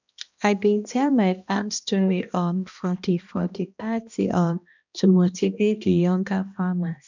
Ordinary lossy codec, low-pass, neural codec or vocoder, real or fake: none; 7.2 kHz; codec, 16 kHz, 1 kbps, X-Codec, HuBERT features, trained on balanced general audio; fake